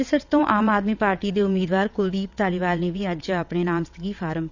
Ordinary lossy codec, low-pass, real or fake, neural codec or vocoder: none; 7.2 kHz; fake; vocoder, 22.05 kHz, 80 mel bands, WaveNeXt